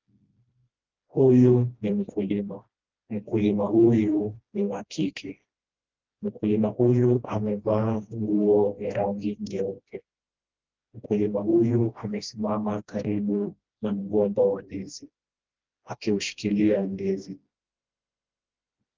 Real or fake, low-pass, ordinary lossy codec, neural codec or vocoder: fake; 7.2 kHz; Opus, 32 kbps; codec, 16 kHz, 1 kbps, FreqCodec, smaller model